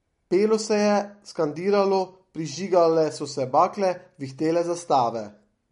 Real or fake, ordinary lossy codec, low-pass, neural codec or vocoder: real; MP3, 48 kbps; 19.8 kHz; none